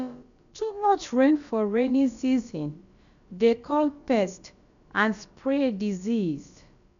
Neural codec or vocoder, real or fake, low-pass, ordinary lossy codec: codec, 16 kHz, about 1 kbps, DyCAST, with the encoder's durations; fake; 7.2 kHz; none